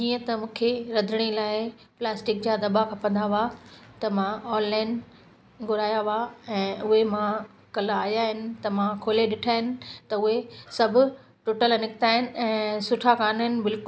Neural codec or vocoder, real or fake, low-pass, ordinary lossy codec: none; real; none; none